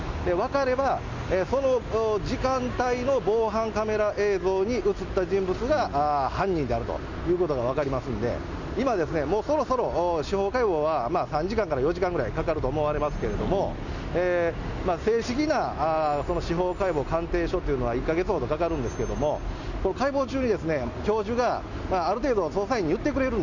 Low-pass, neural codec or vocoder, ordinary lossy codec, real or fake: 7.2 kHz; none; none; real